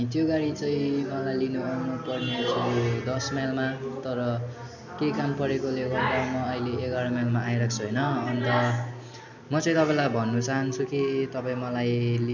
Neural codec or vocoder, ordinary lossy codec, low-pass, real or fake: none; none; 7.2 kHz; real